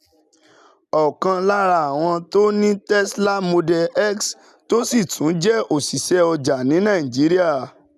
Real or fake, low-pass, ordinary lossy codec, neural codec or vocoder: real; 14.4 kHz; none; none